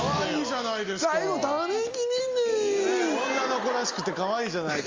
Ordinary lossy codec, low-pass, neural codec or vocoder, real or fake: Opus, 32 kbps; 7.2 kHz; none; real